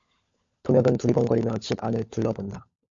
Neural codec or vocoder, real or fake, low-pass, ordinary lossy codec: codec, 16 kHz, 16 kbps, FunCodec, trained on LibriTTS, 50 frames a second; fake; 7.2 kHz; MP3, 48 kbps